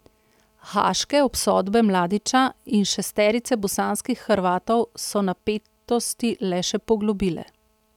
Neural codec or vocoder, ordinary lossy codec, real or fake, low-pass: none; none; real; 19.8 kHz